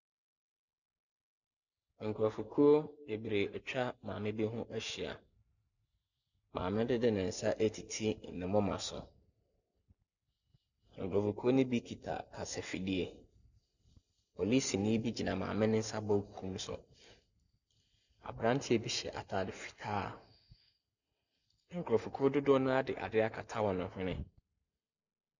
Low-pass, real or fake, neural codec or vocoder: 7.2 kHz; real; none